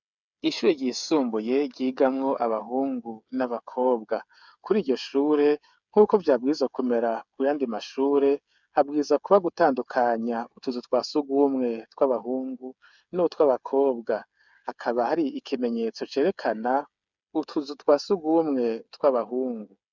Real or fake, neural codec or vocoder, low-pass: fake; codec, 16 kHz, 8 kbps, FreqCodec, smaller model; 7.2 kHz